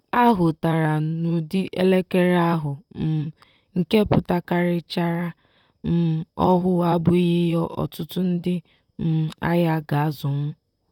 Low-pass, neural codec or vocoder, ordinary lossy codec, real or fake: 19.8 kHz; vocoder, 44.1 kHz, 128 mel bands, Pupu-Vocoder; none; fake